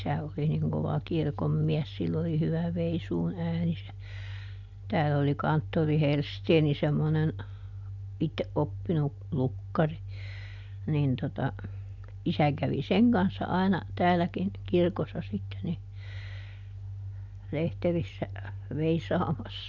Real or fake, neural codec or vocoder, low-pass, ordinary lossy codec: real; none; 7.2 kHz; none